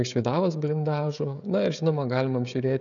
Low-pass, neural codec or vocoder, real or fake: 7.2 kHz; codec, 16 kHz, 16 kbps, FreqCodec, smaller model; fake